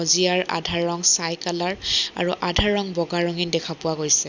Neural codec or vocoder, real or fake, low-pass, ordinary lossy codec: none; real; 7.2 kHz; none